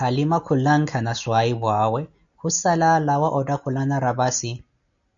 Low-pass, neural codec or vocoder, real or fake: 7.2 kHz; none; real